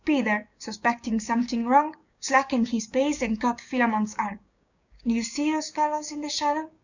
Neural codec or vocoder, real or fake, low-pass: codec, 16 kHz, 6 kbps, DAC; fake; 7.2 kHz